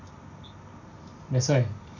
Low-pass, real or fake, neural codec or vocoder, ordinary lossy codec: 7.2 kHz; real; none; none